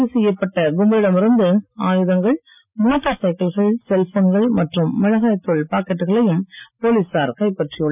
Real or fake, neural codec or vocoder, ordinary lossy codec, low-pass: real; none; none; 3.6 kHz